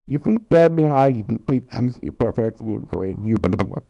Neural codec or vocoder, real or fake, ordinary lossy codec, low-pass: codec, 24 kHz, 0.9 kbps, WavTokenizer, small release; fake; none; 10.8 kHz